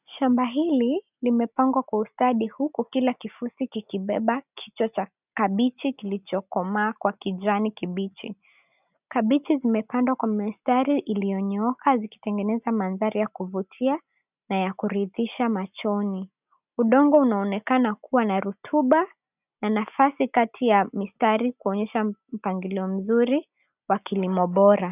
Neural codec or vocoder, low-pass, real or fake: none; 3.6 kHz; real